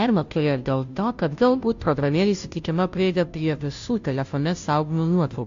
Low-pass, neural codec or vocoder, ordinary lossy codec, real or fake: 7.2 kHz; codec, 16 kHz, 0.5 kbps, FunCodec, trained on Chinese and English, 25 frames a second; AAC, 64 kbps; fake